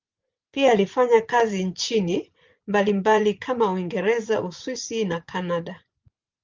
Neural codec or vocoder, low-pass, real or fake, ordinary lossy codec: vocoder, 24 kHz, 100 mel bands, Vocos; 7.2 kHz; fake; Opus, 24 kbps